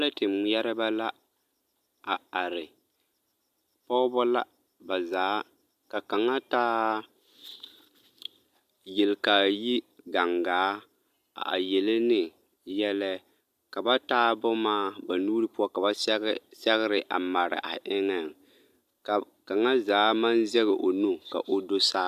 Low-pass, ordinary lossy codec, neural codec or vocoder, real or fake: 14.4 kHz; MP3, 96 kbps; none; real